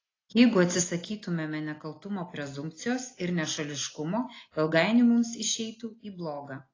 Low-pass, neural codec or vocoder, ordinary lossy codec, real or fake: 7.2 kHz; none; AAC, 32 kbps; real